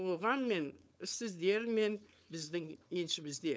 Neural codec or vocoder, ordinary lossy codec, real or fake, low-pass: codec, 16 kHz, 4.8 kbps, FACodec; none; fake; none